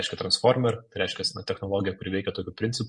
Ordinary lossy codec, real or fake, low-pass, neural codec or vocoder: MP3, 48 kbps; fake; 10.8 kHz; vocoder, 44.1 kHz, 128 mel bands every 512 samples, BigVGAN v2